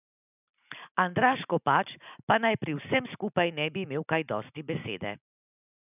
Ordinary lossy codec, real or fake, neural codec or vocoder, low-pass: none; real; none; 3.6 kHz